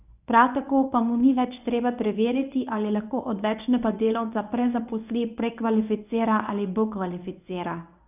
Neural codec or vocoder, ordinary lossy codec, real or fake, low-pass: codec, 24 kHz, 0.9 kbps, WavTokenizer, medium speech release version 1; none; fake; 3.6 kHz